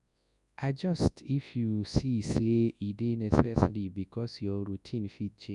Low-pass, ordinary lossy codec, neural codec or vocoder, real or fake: 10.8 kHz; none; codec, 24 kHz, 0.9 kbps, WavTokenizer, large speech release; fake